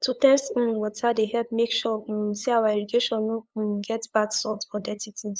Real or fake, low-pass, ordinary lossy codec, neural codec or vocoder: fake; none; none; codec, 16 kHz, 8 kbps, FunCodec, trained on LibriTTS, 25 frames a second